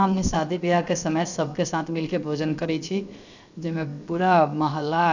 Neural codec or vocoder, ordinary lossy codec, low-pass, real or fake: codec, 16 kHz, 0.7 kbps, FocalCodec; none; 7.2 kHz; fake